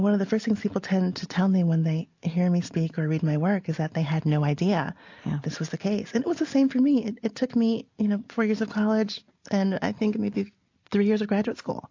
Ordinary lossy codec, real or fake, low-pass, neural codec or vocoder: AAC, 48 kbps; real; 7.2 kHz; none